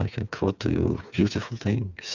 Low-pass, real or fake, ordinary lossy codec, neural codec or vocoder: 7.2 kHz; fake; Opus, 64 kbps; codec, 16 kHz, 4 kbps, FreqCodec, smaller model